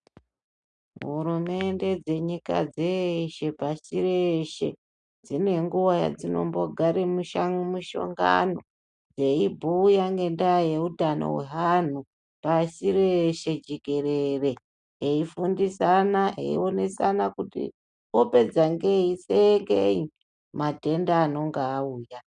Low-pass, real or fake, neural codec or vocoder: 9.9 kHz; real; none